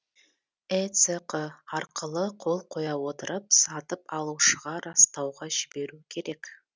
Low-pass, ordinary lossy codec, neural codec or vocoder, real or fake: none; none; none; real